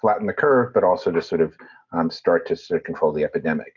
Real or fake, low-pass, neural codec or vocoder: real; 7.2 kHz; none